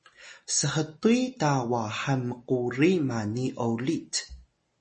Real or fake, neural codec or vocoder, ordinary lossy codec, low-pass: real; none; MP3, 32 kbps; 10.8 kHz